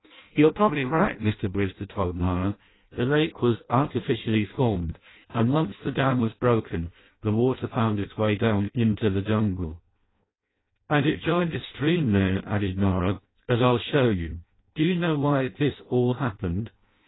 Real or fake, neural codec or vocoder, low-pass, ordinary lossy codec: fake; codec, 16 kHz in and 24 kHz out, 0.6 kbps, FireRedTTS-2 codec; 7.2 kHz; AAC, 16 kbps